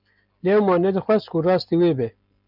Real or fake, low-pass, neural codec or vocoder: real; 5.4 kHz; none